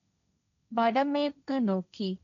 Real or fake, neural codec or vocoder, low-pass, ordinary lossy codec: fake; codec, 16 kHz, 1.1 kbps, Voila-Tokenizer; 7.2 kHz; none